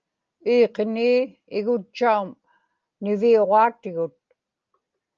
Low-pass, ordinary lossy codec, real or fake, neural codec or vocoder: 7.2 kHz; Opus, 24 kbps; real; none